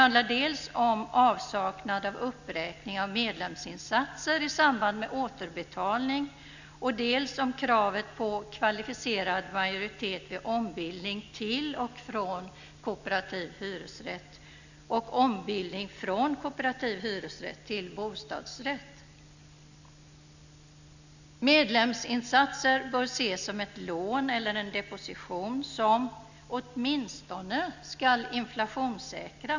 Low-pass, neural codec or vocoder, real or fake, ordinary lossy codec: 7.2 kHz; none; real; none